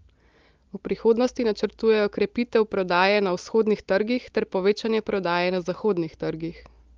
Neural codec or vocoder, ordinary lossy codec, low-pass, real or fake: none; Opus, 32 kbps; 7.2 kHz; real